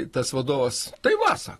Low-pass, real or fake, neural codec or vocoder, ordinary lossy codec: 14.4 kHz; real; none; AAC, 32 kbps